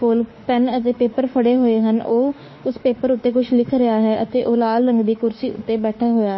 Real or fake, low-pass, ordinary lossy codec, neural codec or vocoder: fake; 7.2 kHz; MP3, 24 kbps; autoencoder, 48 kHz, 32 numbers a frame, DAC-VAE, trained on Japanese speech